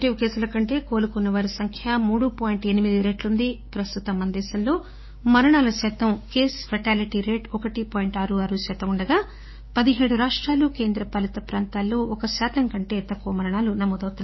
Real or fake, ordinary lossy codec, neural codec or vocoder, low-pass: fake; MP3, 24 kbps; codec, 16 kHz, 6 kbps, DAC; 7.2 kHz